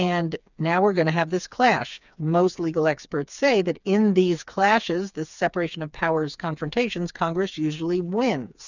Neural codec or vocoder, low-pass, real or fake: codec, 16 kHz, 4 kbps, FreqCodec, smaller model; 7.2 kHz; fake